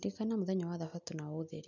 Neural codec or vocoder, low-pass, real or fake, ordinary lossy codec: none; 7.2 kHz; real; none